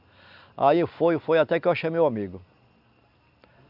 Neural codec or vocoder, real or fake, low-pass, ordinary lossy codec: none; real; 5.4 kHz; none